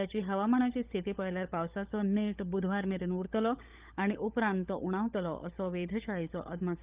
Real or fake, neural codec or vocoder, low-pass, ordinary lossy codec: fake; codec, 16 kHz, 16 kbps, FunCodec, trained on LibriTTS, 50 frames a second; 3.6 kHz; Opus, 24 kbps